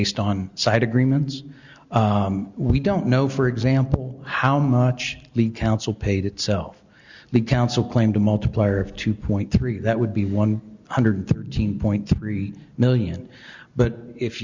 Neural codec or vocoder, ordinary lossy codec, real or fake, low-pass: none; Opus, 64 kbps; real; 7.2 kHz